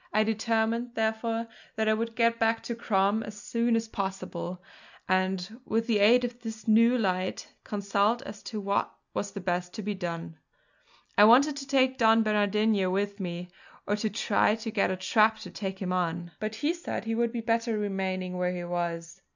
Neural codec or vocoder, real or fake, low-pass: none; real; 7.2 kHz